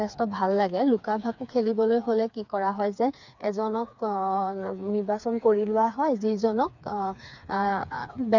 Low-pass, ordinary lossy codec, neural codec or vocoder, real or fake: 7.2 kHz; none; codec, 16 kHz, 4 kbps, FreqCodec, smaller model; fake